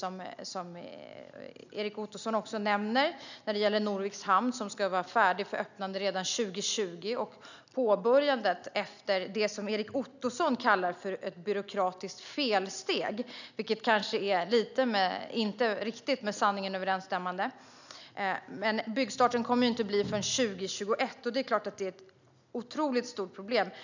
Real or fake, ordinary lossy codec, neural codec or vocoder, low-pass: real; none; none; 7.2 kHz